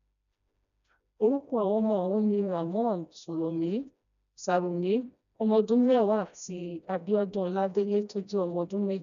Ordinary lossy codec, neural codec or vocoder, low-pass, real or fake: none; codec, 16 kHz, 1 kbps, FreqCodec, smaller model; 7.2 kHz; fake